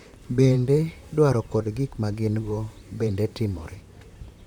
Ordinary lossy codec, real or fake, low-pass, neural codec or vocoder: none; fake; 19.8 kHz; vocoder, 44.1 kHz, 128 mel bands, Pupu-Vocoder